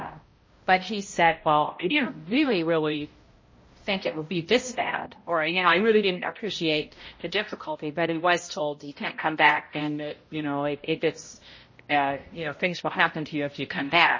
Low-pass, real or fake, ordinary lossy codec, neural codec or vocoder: 7.2 kHz; fake; MP3, 32 kbps; codec, 16 kHz, 0.5 kbps, X-Codec, HuBERT features, trained on balanced general audio